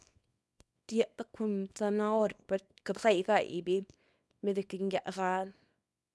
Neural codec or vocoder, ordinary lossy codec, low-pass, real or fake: codec, 24 kHz, 0.9 kbps, WavTokenizer, small release; none; none; fake